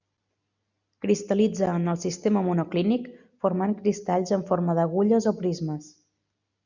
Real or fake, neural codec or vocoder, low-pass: real; none; 7.2 kHz